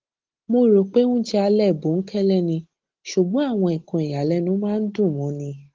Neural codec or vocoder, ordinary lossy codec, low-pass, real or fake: none; Opus, 16 kbps; 7.2 kHz; real